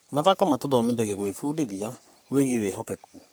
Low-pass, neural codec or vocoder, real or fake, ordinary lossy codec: none; codec, 44.1 kHz, 3.4 kbps, Pupu-Codec; fake; none